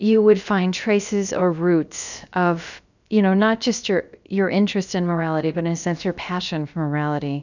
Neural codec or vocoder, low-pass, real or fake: codec, 16 kHz, about 1 kbps, DyCAST, with the encoder's durations; 7.2 kHz; fake